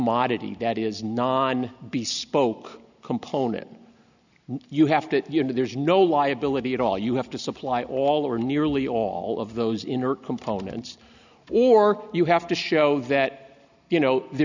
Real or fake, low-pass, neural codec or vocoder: real; 7.2 kHz; none